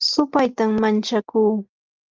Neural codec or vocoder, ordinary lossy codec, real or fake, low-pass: none; Opus, 32 kbps; real; 7.2 kHz